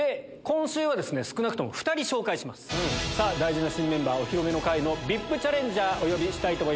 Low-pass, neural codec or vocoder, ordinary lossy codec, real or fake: none; none; none; real